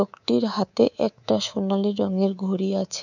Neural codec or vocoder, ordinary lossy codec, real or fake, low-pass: codec, 24 kHz, 3.1 kbps, DualCodec; none; fake; 7.2 kHz